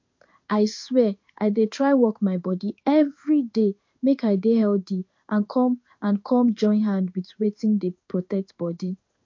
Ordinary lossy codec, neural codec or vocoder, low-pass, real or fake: MP3, 48 kbps; codec, 16 kHz in and 24 kHz out, 1 kbps, XY-Tokenizer; 7.2 kHz; fake